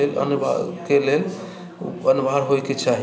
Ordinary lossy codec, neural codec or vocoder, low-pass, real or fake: none; none; none; real